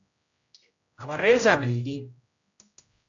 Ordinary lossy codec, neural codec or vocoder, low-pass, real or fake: AAC, 64 kbps; codec, 16 kHz, 0.5 kbps, X-Codec, HuBERT features, trained on general audio; 7.2 kHz; fake